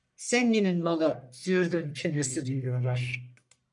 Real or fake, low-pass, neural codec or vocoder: fake; 10.8 kHz; codec, 44.1 kHz, 1.7 kbps, Pupu-Codec